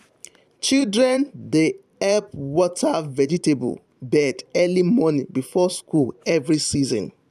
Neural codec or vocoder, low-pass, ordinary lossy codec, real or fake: vocoder, 44.1 kHz, 128 mel bands every 512 samples, BigVGAN v2; 14.4 kHz; none; fake